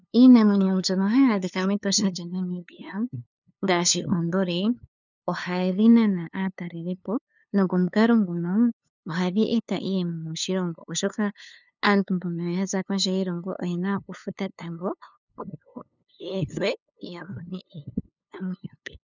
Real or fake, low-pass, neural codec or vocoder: fake; 7.2 kHz; codec, 16 kHz, 2 kbps, FunCodec, trained on LibriTTS, 25 frames a second